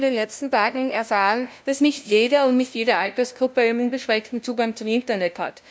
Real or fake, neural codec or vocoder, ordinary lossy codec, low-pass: fake; codec, 16 kHz, 0.5 kbps, FunCodec, trained on LibriTTS, 25 frames a second; none; none